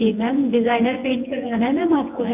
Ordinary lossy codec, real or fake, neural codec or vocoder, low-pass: none; fake; vocoder, 24 kHz, 100 mel bands, Vocos; 3.6 kHz